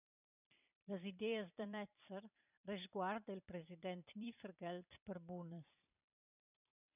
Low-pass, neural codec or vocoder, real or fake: 3.6 kHz; vocoder, 44.1 kHz, 128 mel bands every 256 samples, BigVGAN v2; fake